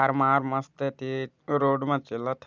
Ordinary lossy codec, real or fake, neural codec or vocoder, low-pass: none; real; none; 7.2 kHz